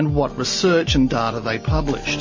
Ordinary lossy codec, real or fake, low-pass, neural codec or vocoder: MP3, 32 kbps; real; 7.2 kHz; none